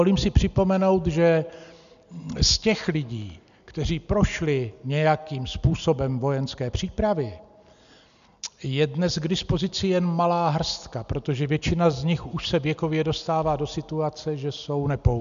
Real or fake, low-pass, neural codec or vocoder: real; 7.2 kHz; none